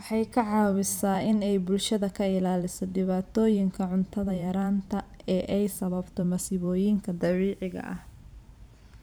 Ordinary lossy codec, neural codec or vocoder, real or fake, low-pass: none; vocoder, 44.1 kHz, 128 mel bands every 512 samples, BigVGAN v2; fake; none